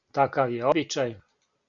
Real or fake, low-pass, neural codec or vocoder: real; 7.2 kHz; none